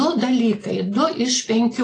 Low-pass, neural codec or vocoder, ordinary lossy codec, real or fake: 9.9 kHz; none; AAC, 32 kbps; real